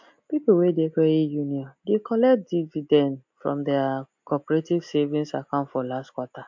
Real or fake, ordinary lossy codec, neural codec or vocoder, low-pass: real; MP3, 48 kbps; none; 7.2 kHz